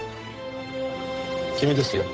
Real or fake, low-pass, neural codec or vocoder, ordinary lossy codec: fake; none; codec, 16 kHz, 8 kbps, FunCodec, trained on Chinese and English, 25 frames a second; none